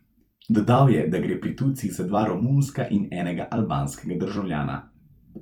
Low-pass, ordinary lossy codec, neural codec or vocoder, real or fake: 19.8 kHz; none; vocoder, 44.1 kHz, 128 mel bands every 256 samples, BigVGAN v2; fake